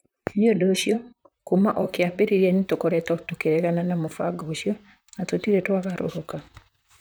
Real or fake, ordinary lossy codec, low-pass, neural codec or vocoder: fake; none; none; vocoder, 44.1 kHz, 128 mel bands, Pupu-Vocoder